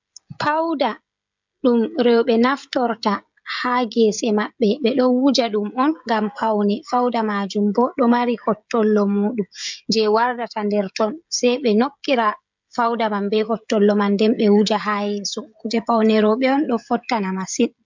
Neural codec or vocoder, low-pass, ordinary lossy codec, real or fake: codec, 16 kHz, 16 kbps, FreqCodec, smaller model; 7.2 kHz; MP3, 64 kbps; fake